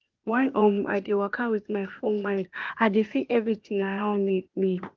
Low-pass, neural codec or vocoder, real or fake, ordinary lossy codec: 7.2 kHz; codec, 16 kHz, 0.8 kbps, ZipCodec; fake; Opus, 32 kbps